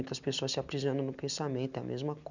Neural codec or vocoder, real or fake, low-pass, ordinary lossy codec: none; real; 7.2 kHz; none